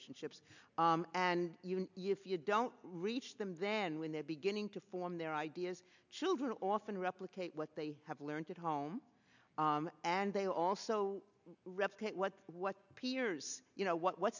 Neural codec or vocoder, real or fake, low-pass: none; real; 7.2 kHz